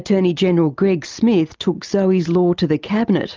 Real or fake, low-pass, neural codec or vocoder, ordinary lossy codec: real; 7.2 kHz; none; Opus, 24 kbps